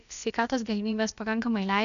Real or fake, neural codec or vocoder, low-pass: fake; codec, 16 kHz, about 1 kbps, DyCAST, with the encoder's durations; 7.2 kHz